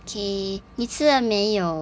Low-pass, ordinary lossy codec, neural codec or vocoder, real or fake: none; none; none; real